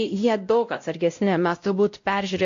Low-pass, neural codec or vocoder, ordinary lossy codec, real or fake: 7.2 kHz; codec, 16 kHz, 0.5 kbps, X-Codec, WavLM features, trained on Multilingual LibriSpeech; AAC, 48 kbps; fake